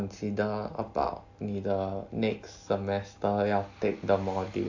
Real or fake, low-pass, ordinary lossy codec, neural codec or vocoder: real; 7.2 kHz; AAC, 48 kbps; none